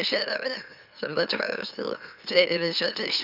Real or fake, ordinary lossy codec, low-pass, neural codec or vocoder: fake; none; 5.4 kHz; autoencoder, 44.1 kHz, a latent of 192 numbers a frame, MeloTTS